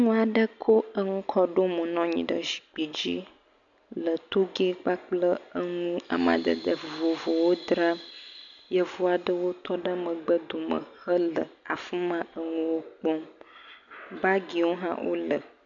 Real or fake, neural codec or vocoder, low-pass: real; none; 7.2 kHz